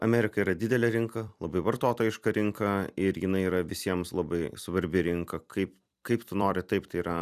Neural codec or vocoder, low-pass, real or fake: vocoder, 44.1 kHz, 128 mel bands every 256 samples, BigVGAN v2; 14.4 kHz; fake